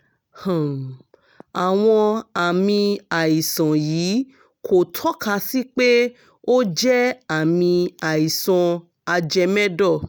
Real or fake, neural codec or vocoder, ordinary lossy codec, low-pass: real; none; none; none